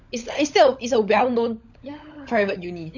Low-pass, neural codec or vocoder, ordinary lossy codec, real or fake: 7.2 kHz; codec, 16 kHz, 16 kbps, FunCodec, trained on LibriTTS, 50 frames a second; none; fake